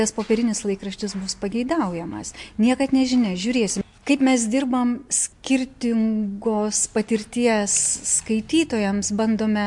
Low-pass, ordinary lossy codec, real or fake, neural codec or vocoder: 10.8 kHz; MP3, 64 kbps; real; none